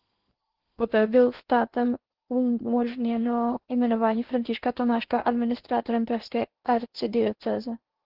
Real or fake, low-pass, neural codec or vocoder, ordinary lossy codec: fake; 5.4 kHz; codec, 16 kHz in and 24 kHz out, 0.8 kbps, FocalCodec, streaming, 65536 codes; Opus, 16 kbps